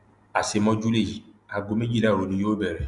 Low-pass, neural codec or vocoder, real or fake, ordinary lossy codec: 10.8 kHz; none; real; Opus, 64 kbps